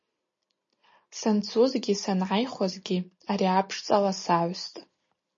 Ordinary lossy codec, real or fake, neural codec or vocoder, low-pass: MP3, 32 kbps; real; none; 7.2 kHz